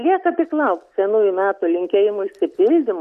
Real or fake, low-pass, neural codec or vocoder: real; 14.4 kHz; none